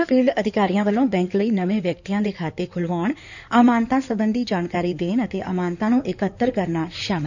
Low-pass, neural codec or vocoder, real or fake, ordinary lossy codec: 7.2 kHz; codec, 16 kHz in and 24 kHz out, 2.2 kbps, FireRedTTS-2 codec; fake; none